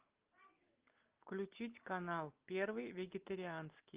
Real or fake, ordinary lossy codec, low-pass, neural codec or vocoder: real; Opus, 32 kbps; 3.6 kHz; none